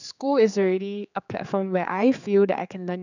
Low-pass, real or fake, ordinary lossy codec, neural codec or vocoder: 7.2 kHz; fake; none; codec, 16 kHz, 4 kbps, X-Codec, HuBERT features, trained on general audio